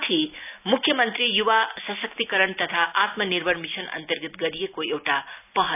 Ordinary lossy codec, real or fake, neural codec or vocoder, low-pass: none; real; none; 3.6 kHz